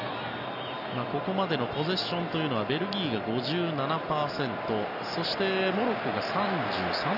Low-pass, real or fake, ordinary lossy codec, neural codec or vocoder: 5.4 kHz; real; none; none